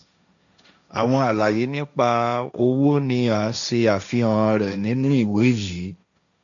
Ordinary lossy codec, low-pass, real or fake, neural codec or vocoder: none; 7.2 kHz; fake; codec, 16 kHz, 1.1 kbps, Voila-Tokenizer